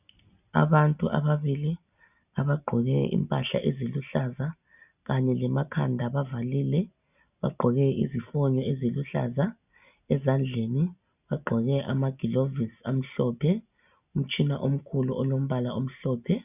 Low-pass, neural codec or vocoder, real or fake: 3.6 kHz; none; real